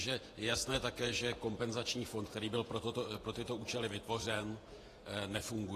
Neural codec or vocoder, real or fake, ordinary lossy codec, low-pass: vocoder, 48 kHz, 128 mel bands, Vocos; fake; AAC, 48 kbps; 14.4 kHz